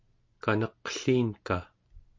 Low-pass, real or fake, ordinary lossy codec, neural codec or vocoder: 7.2 kHz; real; MP3, 48 kbps; none